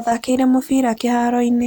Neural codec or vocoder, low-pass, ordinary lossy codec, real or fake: none; none; none; real